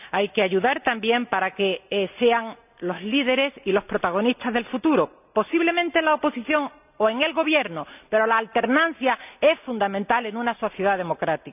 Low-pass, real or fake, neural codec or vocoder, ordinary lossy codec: 3.6 kHz; real; none; none